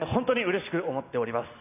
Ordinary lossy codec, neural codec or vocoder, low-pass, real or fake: none; none; 3.6 kHz; real